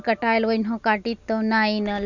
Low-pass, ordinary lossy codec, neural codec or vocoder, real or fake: 7.2 kHz; none; none; real